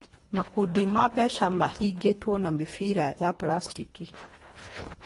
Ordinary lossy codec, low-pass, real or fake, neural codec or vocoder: AAC, 32 kbps; 10.8 kHz; fake; codec, 24 kHz, 1.5 kbps, HILCodec